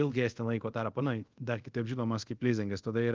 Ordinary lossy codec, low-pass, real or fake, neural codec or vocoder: Opus, 32 kbps; 7.2 kHz; fake; codec, 24 kHz, 0.5 kbps, DualCodec